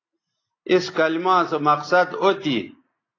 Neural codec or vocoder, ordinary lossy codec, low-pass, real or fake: none; AAC, 32 kbps; 7.2 kHz; real